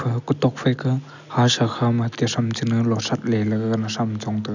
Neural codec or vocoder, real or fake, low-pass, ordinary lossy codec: none; real; 7.2 kHz; none